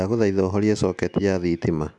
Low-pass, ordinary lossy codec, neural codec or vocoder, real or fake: 10.8 kHz; MP3, 96 kbps; none; real